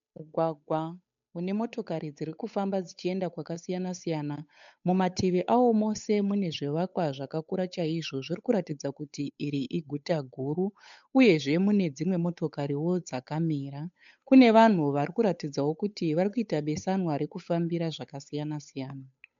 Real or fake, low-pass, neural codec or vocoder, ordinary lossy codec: fake; 7.2 kHz; codec, 16 kHz, 8 kbps, FunCodec, trained on Chinese and English, 25 frames a second; MP3, 64 kbps